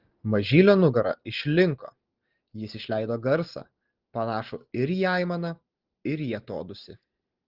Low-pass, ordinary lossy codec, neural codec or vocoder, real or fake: 5.4 kHz; Opus, 16 kbps; none; real